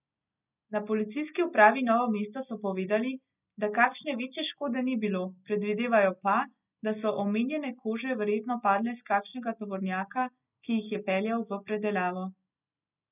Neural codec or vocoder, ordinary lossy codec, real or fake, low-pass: none; none; real; 3.6 kHz